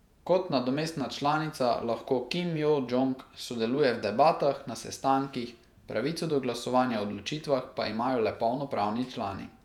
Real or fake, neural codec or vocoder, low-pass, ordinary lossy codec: fake; vocoder, 48 kHz, 128 mel bands, Vocos; 19.8 kHz; none